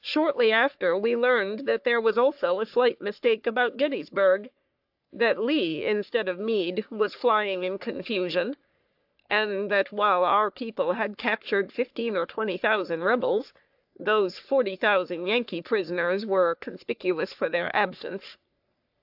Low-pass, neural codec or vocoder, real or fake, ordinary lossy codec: 5.4 kHz; codec, 44.1 kHz, 3.4 kbps, Pupu-Codec; fake; AAC, 48 kbps